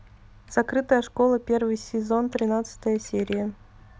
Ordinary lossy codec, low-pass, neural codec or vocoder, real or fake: none; none; none; real